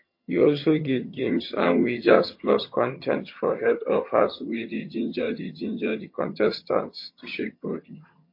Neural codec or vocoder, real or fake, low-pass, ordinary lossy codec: vocoder, 22.05 kHz, 80 mel bands, HiFi-GAN; fake; 5.4 kHz; MP3, 32 kbps